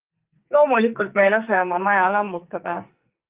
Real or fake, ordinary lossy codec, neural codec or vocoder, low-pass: fake; Opus, 32 kbps; codec, 44.1 kHz, 2.6 kbps, SNAC; 3.6 kHz